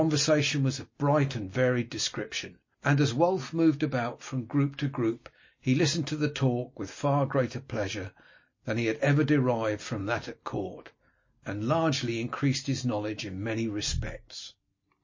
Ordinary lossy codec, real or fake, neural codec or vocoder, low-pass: MP3, 32 kbps; real; none; 7.2 kHz